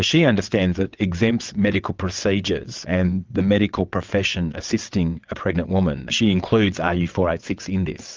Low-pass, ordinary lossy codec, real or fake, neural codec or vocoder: 7.2 kHz; Opus, 24 kbps; fake; vocoder, 22.05 kHz, 80 mel bands, WaveNeXt